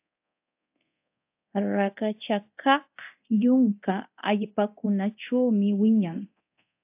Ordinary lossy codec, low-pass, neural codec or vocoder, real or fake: AAC, 32 kbps; 3.6 kHz; codec, 24 kHz, 0.9 kbps, DualCodec; fake